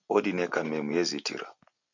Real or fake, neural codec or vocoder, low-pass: real; none; 7.2 kHz